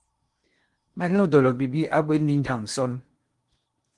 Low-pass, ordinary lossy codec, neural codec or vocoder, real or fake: 10.8 kHz; Opus, 24 kbps; codec, 16 kHz in and 24 kHz out, 0.8 kbps, FocalCodec, streaming, 65536 codes; fake